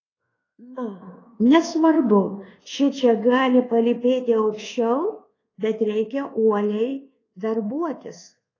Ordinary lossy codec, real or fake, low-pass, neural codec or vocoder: AAC, 32 kbps; fake; 7.2 kHz; codec, 24 kHz, 1.2 kbps, DualCodec